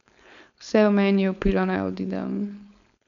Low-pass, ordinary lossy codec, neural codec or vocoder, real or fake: 7.2 kHz; none; codec, 16 kHz, 4.8 kbps, FACodec; fake